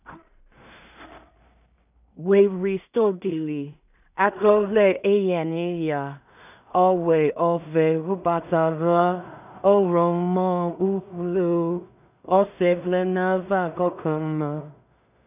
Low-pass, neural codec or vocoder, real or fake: 3.6 kHz; codec, 16 kHz in and 24 kHz out, 0.4 kbps, LongCat-Audio-Codec, two codebook decoder; fake